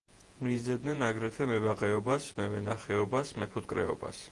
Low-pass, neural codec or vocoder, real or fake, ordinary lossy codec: 10.8 kHz; vocoder, 48 kHz, 128 mel bands, Vocos; fake; Opus, 24 kbps